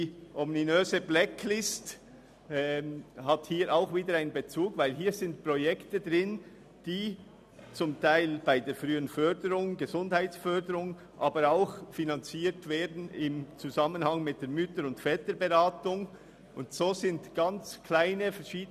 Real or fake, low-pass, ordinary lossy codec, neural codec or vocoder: real; 14.4 kHz; none; none